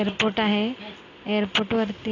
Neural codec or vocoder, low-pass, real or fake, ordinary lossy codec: none; 7.2 kHz; real; AAC, 32 kbps